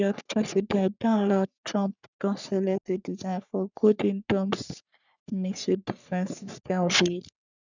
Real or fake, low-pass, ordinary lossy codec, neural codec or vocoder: fake; 7.2 kHz; none; codec, 44.1 kHz, 3.4 kbps, Pupu-Codec